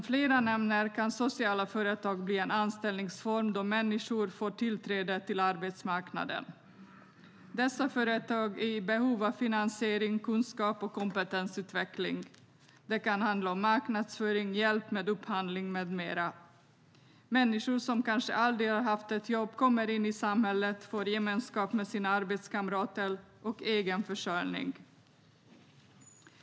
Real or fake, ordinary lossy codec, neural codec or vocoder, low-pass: real; none; none; none